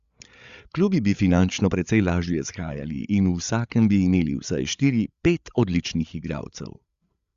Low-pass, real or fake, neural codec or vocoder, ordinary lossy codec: 7.2 kHz; fake; codec, 16 kHz, 8 kbps, FreqCodec, larger model; Opus, 64 kbps